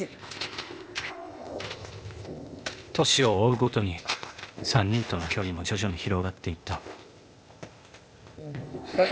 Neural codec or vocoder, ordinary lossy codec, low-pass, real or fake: codec, 16 kHz, 0.8 kbps, ZipCodec; none; none; fake